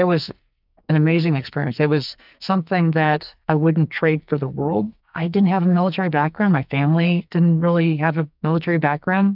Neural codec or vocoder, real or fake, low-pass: codec, 44.1 kHz, 2.6 kbps, SNAC; fake; 5.4 kHz